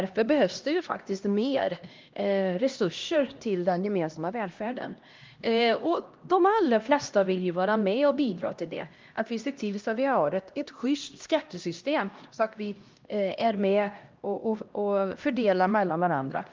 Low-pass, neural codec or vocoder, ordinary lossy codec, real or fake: 7.2 kHz; codec, 16 kHz, 1 kbps, X-Codec, HuBERT features, trained on LibriSpeech; Opus, 32 kbps; fake